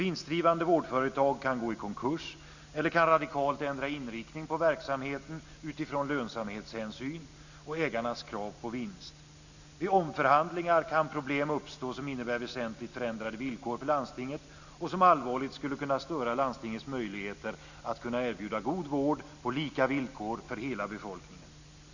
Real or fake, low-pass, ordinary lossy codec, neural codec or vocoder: real; 7.2 kHz; none; none